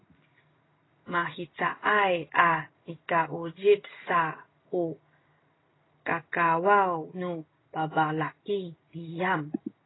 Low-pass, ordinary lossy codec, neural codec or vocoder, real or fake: 7.2 kHz; AAC, 16 kbps; none; real